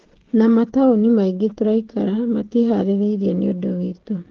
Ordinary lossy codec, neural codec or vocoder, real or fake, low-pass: Opus, 16 kbps; codec, 16 kHz, 16 kbps, FreqCodec, smaller model; fake; 7.2 kHz